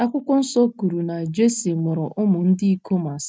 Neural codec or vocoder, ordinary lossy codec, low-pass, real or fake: none; none; none; real